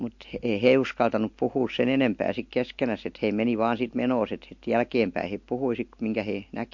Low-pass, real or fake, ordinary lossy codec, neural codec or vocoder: 7.2 kHz; real; MP3, 48 kbps; none